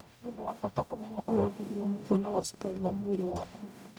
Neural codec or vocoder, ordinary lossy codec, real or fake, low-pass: codec, 44.1 kHz, 0.9 kbps, DAC; none; fake; none